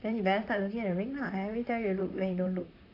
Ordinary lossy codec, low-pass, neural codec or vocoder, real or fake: AAC, 32 kbps; 5.4 kHz; vocoder, 44.1 kHz, 128 mel bands, Pupu-Vocoder; fake